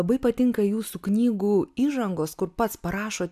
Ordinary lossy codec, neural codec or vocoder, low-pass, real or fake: AAC, 96 kbps; none; 14.4 kHz; real